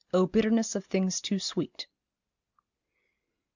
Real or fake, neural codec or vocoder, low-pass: real; none; 7.2 kHz